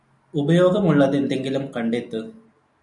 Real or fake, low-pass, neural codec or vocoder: real; 10.8 kHz; none